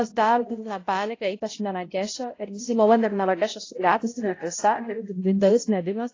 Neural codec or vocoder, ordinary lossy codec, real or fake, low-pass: codec, 16 kHz, 0.5 kbps, X-Codec, HuBERT features, trained on balanced general audio; AAC, 32 kbps; fake; 7.2 kHz